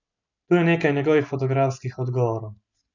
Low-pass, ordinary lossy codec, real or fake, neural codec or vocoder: 7.2 kHz; none; real; none